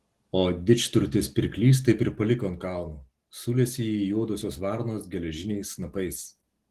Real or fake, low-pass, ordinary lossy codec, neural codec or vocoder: real; 14.4 kHz; Opus, 16 kbps; none